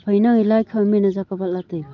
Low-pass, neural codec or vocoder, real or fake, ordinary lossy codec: 7.2 kHz; none; real; Opus, 32 kbps